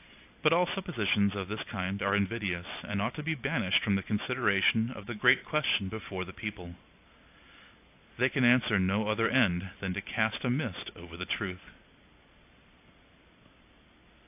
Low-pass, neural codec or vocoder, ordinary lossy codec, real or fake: 3.6 kHz; none; AAC, 32 kbps; real